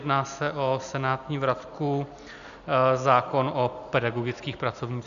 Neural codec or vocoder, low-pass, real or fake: none; 7.2 kHz; real